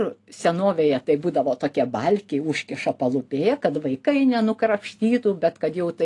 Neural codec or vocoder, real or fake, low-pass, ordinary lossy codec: none; real; 10.8 kHz; AAC, 48 kbps